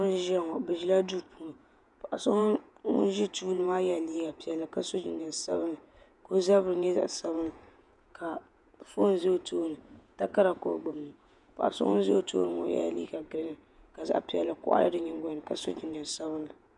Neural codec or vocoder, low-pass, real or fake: vocoder, 48 kHz, 128 mel bands, Vocos; 10.8 kHz; fake